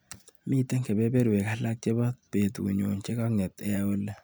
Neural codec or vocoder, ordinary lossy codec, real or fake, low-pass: none; none; real; none